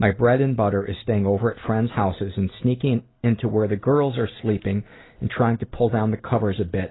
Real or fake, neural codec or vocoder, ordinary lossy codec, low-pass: real; none; AAC, 16 kbps; 7.2 kHz